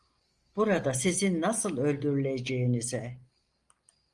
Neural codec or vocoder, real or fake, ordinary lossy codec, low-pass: none; real; Opus, 32 kbps; 10.8 kHz